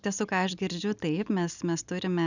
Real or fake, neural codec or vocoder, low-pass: real; none; 7.2 kHz